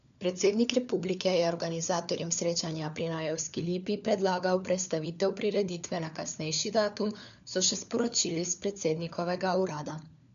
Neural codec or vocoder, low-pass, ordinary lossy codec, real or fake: codec, 16 kHz, 4 kbps, FunCodec, trained on LibriTTS, 50 frames a second; 7.2 kHz; none; fake